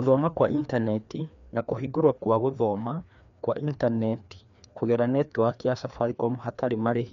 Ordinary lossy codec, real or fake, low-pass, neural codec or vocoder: MP3, 64 kbps; fake; 7.2 kHz; codec, 16 kHz, 2 kbps, FreqCodec, larger model